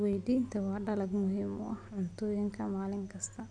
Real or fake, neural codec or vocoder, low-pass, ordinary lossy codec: real; none; 9.9 kHz; none